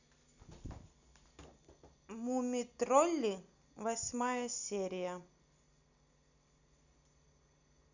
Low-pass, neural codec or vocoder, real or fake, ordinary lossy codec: 7.2 kHz; none; real; none